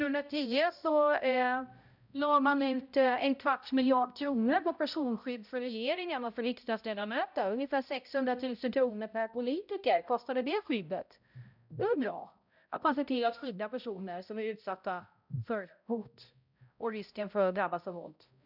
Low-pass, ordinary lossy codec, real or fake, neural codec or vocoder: 5.4 kHz; none; fake; codec, 16 kHz, 0.5 kbps, X-Codec, HuBERT features, trained on balanced general audio